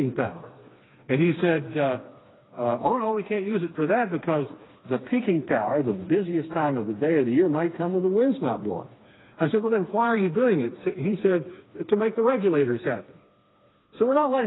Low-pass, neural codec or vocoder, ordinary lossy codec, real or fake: 7.2 kHz; codec, 16 kHz, 2 kbps, FreqCodec, smaller model; AAC, 16 kbps; fake